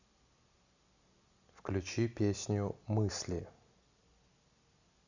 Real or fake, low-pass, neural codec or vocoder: real; 7.2 kHz; none